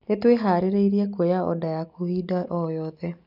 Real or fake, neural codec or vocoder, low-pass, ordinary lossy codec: real; none; 5.4 kHz; none